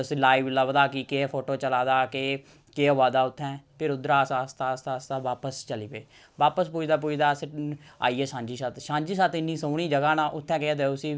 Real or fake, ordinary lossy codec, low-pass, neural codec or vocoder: real; none; none; none